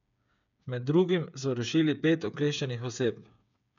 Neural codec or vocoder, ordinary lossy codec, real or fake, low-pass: codec, 16 kHz, 8 kbps, FreqCodec, smaller model; none; fake; 7.2 kHz